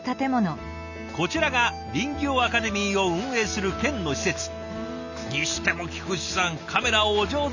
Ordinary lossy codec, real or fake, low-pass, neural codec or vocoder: none; real; 7.2 kHz; none